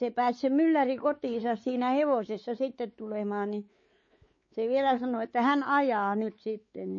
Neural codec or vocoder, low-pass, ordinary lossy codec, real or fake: codec, 16 kHz, 4 kbps, X-Codec, WavLM features, trained on Multilingual LibriSpeech; 7.2 kHz; MP3, 32 kbps; fake